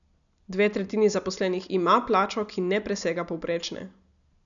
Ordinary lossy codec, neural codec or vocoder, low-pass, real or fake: none; none; 7.2 kHz; real